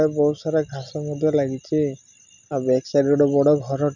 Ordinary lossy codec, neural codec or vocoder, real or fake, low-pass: none; none; real; 7.2 kHz